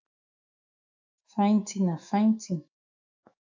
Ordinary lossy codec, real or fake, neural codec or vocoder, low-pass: AAC, 48 kbps; fake; autoencoder, 48 kHz, 128 numbers a frame, DAC-VAE, trained on Japanese speech; 7.2 kHz